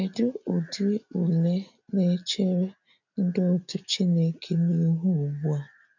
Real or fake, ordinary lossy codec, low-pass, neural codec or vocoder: real; none; 7.2 kHz; none